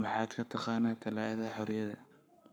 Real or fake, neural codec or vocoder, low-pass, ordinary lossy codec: fake; codec, 44.1 kHz, 7.8 kbps, Pupu-Codec; none; none